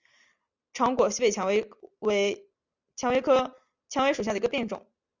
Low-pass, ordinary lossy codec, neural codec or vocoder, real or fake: 7.2 kHz; Opus, 64 kbps; none; real